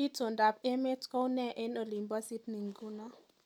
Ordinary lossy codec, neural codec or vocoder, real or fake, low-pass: none; none; real; 19.8 kHz